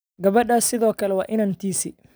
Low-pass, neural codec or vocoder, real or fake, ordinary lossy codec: none; none; real; none